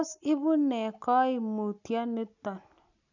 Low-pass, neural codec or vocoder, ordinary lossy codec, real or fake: 7.2 kHz; none; none; real